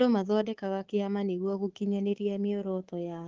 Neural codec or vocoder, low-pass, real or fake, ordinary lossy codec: codec, 24 kHz, 3.1 kbps, DualCodec; 7.2 kHz; fake; Opus, 16 kbps